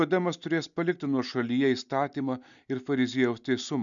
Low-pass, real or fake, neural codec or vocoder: 7.2 kHz; real; none